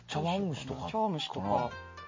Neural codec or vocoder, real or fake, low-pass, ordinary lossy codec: none; real; 7.2 kHz; MP3, 32 kbps